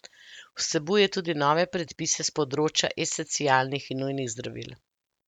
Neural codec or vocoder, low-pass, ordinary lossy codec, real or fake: none; 19.8 kHz; none; real